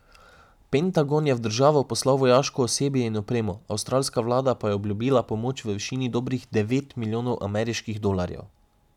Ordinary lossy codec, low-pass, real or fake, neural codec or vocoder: none; 19.8 kHz; real; none